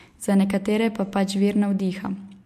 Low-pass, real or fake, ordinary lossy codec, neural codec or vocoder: 14.4 kHz; real; MP3, 64 kbps; none